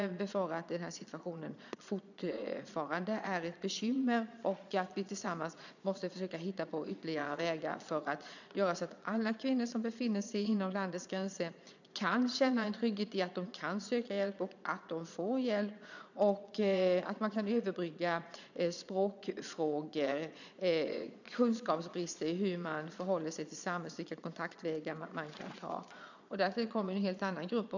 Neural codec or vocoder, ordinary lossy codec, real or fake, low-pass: vocoder, 22.05 kHz, 80 mel bands, Vocos; none; fake; 7.2 kHz